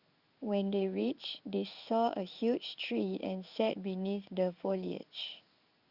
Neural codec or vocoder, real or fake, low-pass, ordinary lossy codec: codec, 16 kHz in and 24 kHz out, 1 kbps, XY-Tokenizer; fake; 5.4 kHz; Opus, 64 kbps